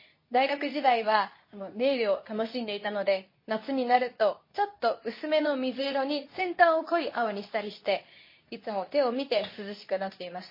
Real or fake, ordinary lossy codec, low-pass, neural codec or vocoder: fake; MP3, 24 kbps; 5.4 kHz; codec, 24 kHz, 0.9 kbps, WavTokenizer, medium speech release version 2